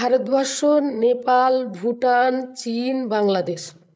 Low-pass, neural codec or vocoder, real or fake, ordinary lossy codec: none; codec, 16 kHz, 8 kbps, FreqCodec, larger model; fake; none